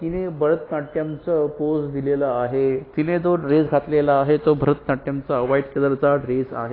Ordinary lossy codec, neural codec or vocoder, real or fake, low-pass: AAC, 24 kbps; none; real; 5.4 kHz